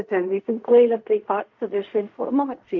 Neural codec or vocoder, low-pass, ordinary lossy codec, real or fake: codec, 16 kHz in and 24 kHz out, 0.4 kbps, LongCat-Audio-Codec, fine tuned four codebook decoder; 7.2 kHz; MP3, 64 kbps; fake